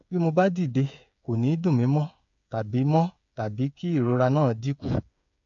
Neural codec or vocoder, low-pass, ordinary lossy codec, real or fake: codec, 16 kHz, 8 kbps, FreqCodec, smaller model; 7.2 kHz; MP3, 64 kbps; fake